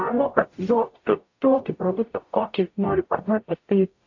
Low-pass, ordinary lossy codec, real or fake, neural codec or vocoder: 7.2 kHz; AAC, 48 kbps; fake; codec, 44.1 kHz, 0.9 kbps, DAC